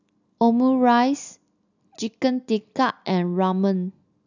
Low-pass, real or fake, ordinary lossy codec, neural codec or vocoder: 7.2 kHz; real; none; none